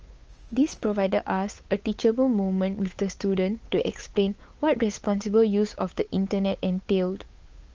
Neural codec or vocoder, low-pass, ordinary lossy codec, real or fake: codec, 16 kHz, 8 kbps, FunCodec, trained on Chinese and English, 25 frames a second; 7.2 kHz; Opus, 24 kbps; fake